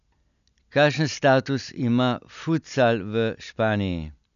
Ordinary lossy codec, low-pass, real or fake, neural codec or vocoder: none; 7.2 kHz; real; none